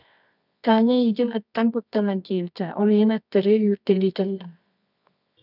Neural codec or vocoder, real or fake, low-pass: codec, 24 kHz, 0.9 kbps, WavTokenizer, medium music audio release; fake; 5.4 kHz